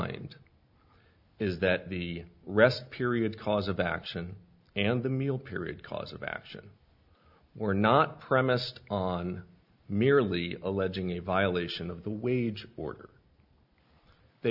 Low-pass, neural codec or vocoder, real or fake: 5.4 kHz; none; real